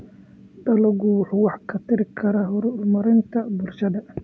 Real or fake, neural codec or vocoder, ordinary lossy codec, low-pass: real; none; none; none